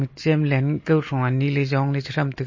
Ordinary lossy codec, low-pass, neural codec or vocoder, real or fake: MP3, 32 kbps; 7.2 kHz; none; real